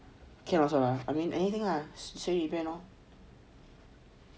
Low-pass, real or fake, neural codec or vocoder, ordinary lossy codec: none; real; none; none